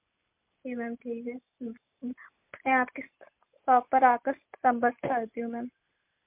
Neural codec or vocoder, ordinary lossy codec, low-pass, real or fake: none; MP3, 32 kbps; 3.6 kHz; real